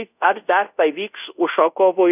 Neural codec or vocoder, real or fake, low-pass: codec, 24 kHz, 0.5 kbps, DualCodec; fake; 3.6 kHz